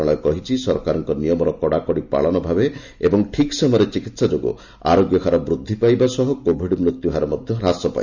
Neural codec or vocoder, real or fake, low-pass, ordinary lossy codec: none; real; 7.2 kHz; none